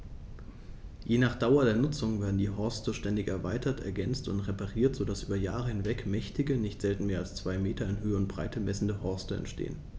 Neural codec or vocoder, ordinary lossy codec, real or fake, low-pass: none; none; real; none